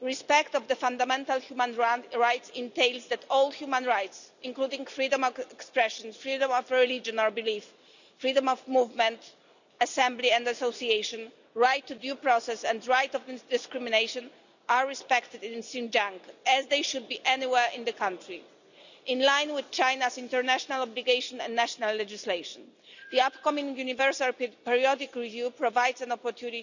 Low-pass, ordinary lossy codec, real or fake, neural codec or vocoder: 7.2 kHz; none; real; none